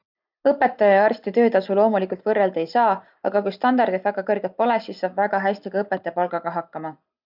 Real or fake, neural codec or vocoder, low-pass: real; none; 5.4 kHz